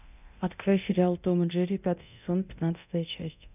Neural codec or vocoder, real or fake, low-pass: codec, 24 kHz, 0.9 kbps, DualCodec; fake; 3.6 kHz